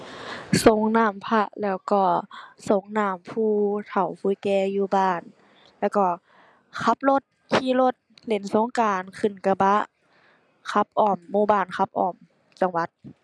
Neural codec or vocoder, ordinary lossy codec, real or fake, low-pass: none; none; real; none